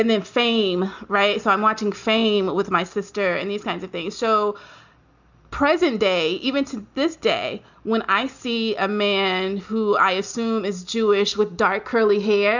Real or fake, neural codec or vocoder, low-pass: real; none; 7.2 kHz